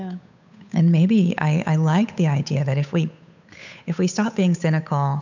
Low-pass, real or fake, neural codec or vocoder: 7.2 kHz; fake; codec, 16 kHz, 8 kbps, FunCodec, trained on Chinese and English, 25 frames a second